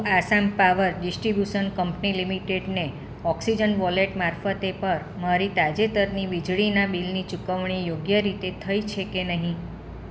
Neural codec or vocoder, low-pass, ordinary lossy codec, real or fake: none; none; none; real